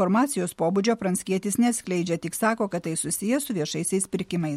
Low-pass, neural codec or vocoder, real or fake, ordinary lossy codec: 19.8 kHz; none; real; MP3, 64 kbps